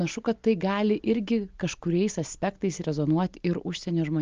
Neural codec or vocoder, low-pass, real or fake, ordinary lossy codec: none; 7.2 kHz; real; Opus, 24 kbps